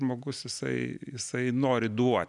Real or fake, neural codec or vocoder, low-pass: real; none; 10.8 kHz